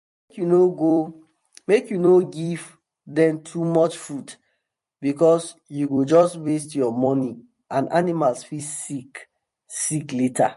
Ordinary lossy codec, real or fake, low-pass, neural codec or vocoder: MP3, 48 kbps; fake; 14.4 kHz; vocoder, 44.1 kHz, 128 mel bands every 256 samples, BigVGAN v2